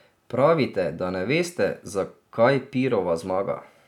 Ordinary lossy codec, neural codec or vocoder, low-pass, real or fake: none; none; 19.8 kHz; real